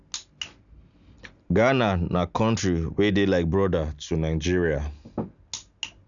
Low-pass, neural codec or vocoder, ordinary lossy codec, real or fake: 7.2 kHz; none; none; real